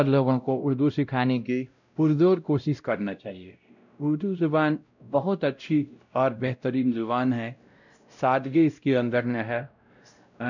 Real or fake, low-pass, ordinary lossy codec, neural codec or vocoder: fake; 7.2 kHz; none; codec, 16 kHz, 0.5 kbps, X-Codec, WavLM features, trained on Multilingual LibriSpeech